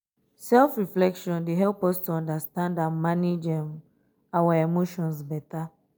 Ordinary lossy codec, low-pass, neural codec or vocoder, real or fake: none; none; none; real